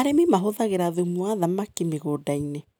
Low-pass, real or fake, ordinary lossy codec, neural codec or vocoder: none; real; none; none